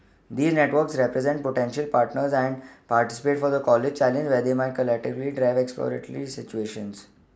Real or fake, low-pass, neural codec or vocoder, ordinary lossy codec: real; none; none; none